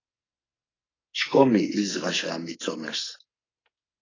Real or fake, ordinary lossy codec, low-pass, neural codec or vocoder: fake; AAC, 32 kbps; 7.2 kHz; codec, 44.1 kHz, 2.6 kbps, SNAC